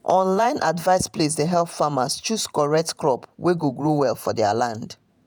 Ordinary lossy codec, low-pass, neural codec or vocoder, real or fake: none; none; vocoder, 48 kHz, 128 mel bands, Vocos; fake